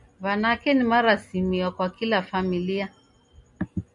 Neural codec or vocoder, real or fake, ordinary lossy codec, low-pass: none; real; MP3, 64 kbps; 10.8 kHz